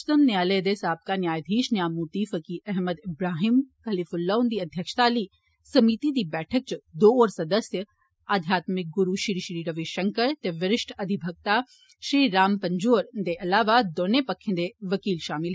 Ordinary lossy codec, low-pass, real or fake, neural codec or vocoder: none; none; real; none